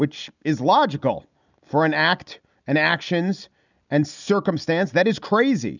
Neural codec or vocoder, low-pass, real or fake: none; 7.2 kHz; real